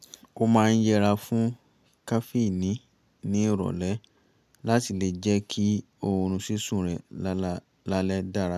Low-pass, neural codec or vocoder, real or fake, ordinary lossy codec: 14.4 kHz; none; real; none